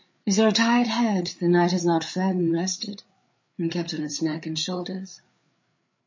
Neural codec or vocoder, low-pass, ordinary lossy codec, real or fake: codec, 16 kHz, 8 kbps, FreqCodec, larger model; 7.2 kHz; MP3, 32 kbps; fake